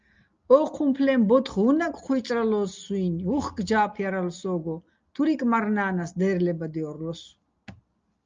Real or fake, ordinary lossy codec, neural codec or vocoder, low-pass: real; Opus, 24 kbps; none; 7.2 kHz